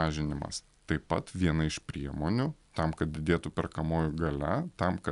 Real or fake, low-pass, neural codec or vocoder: real; 10.8 kHz; none